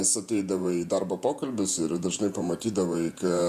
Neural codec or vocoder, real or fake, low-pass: vocoder, 48 kHz, 128 mel bands, Vocos; fake; 14.4 kHz